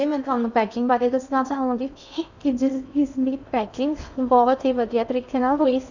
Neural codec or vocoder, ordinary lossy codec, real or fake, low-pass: codec, 16 kHz in and 24 kHz out, 0.6 kbps, FocalCodec, streaming, 2048 codes; none; fake; 7.2 kHz